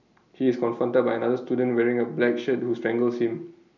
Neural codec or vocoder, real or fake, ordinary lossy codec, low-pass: none; real; none; 7.2 kHz